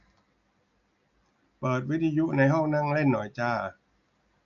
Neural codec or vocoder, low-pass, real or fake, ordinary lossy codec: none; 7.2 kHz; real; none